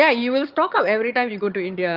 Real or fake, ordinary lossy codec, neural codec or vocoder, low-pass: fake; Opus, 24 kbps; vocoder, 22.05 kHz, 80 mel bands, HiFi-GAN; 5.4 kHz